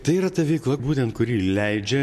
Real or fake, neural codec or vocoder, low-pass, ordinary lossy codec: real; none; 14.4 kHz; MP3, 64 kbps